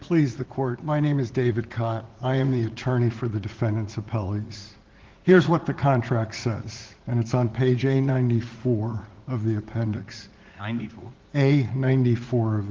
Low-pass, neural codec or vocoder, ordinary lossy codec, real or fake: 7.2 kHz; vocoder, 44.1 kHz, 80 mel bands, Vocos; Opus, 16 kbps; fake